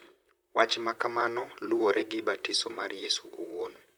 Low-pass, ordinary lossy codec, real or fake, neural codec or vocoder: none; none; fake; vocoder, 44.1 kHz, 128 mel bands, Pupu-Vocoder